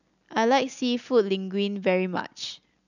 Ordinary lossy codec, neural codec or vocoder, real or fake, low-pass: none; none; real; 7.2 kHz